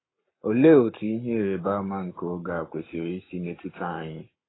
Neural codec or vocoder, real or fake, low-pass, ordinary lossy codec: codec, 44.1 kHz, 7.8 kbps, Pupu-Codec; fake; 7.2 kHz; AAC, 16 kbps